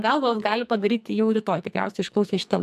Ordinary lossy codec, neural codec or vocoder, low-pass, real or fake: AAC, 96 kbps; codec, 44.1 kHz, 2.6 kbps, SNAC; 14.4 kHz; fake